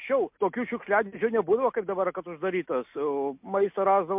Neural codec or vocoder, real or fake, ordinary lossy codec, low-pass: none; real; MP3, 32 kbps; 3.6 kHz